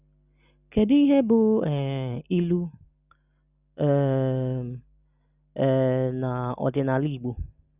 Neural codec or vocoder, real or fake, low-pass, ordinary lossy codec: none; real; 3.6 kHz; none